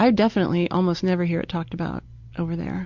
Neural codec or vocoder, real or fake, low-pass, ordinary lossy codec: none; real; 7.2 kHz; MP3, 64 kbps